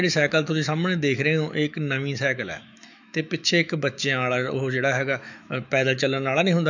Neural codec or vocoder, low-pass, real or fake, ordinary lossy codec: autoencoder, 48 kHz, 128 numbers a frame, DAC-VAE, trained on Japanese speech; 7.2 kHz; fake; none